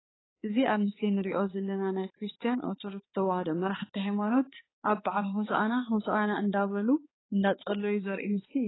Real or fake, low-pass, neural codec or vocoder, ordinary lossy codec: fake; 7.2 kHz; codec, 16 kHz, 4 kbps, X-Codec, HuBERT features, trained on balanced general audio; AAC, 16 kbps